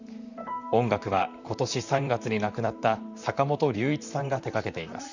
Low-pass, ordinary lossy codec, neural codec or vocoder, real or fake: 7.2 kHz; none; vocoder, 44.1 kHz, 128 mel bands, Pupu-Vocoder; fake